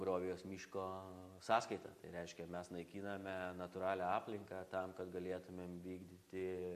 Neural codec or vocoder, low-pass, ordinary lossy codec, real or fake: none; 14.4 kHz; AAC, 64 kbps; real